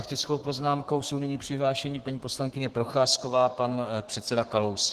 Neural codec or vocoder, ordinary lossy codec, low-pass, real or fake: codec, 32 kHz, 1.9 kbps, SNAC; Opus, 16 kbps; 14.4 kHz; fake